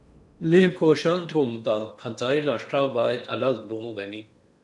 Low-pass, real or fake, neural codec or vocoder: 10.8 kHz; fake; codec, 16 kHz in and 24 kHz out, 0.8 kbps, FocalCodec, streaming, 65536 codes